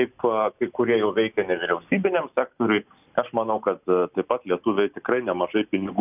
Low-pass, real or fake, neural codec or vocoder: 3.6 kHz; real; none